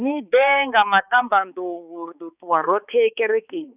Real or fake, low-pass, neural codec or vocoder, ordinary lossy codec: fake; 3.6 kHz; codec, 16 kHz, 4 kbps, X-Codec, HuBERT features, trained on balanced general audio; none